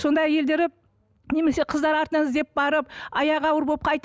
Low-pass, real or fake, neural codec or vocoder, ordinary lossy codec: none; real; none; none